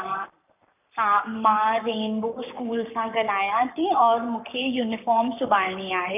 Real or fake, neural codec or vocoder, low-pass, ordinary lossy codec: fake; codec, 44.1 kHz, 7.8 kbps, Pupu-Codec; 3.6 kHz; none